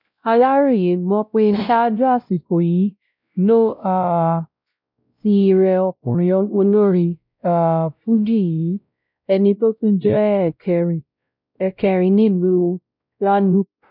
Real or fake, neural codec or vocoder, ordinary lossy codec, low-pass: fake; codec, 16 kHz, 0.5 kbps, X-Codec, WavLM features, trained on Multilingual LibriSpeech; none; 5.4 kHz